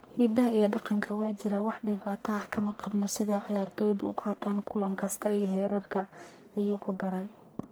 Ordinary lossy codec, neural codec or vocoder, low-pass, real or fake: none; codec, 44.1 kHz, 1.7 kbps, Pupu-Codec; none; fake